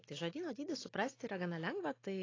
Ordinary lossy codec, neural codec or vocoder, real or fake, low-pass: AAC, 32 kbps; none; real; 7.2 kHz